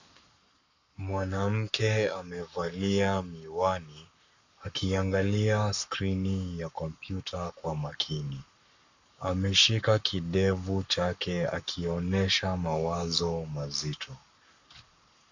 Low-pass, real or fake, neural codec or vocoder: 7.2 kHz; fake; codec, 44.1 kHz, 7.8 kbps, Pupu-Codec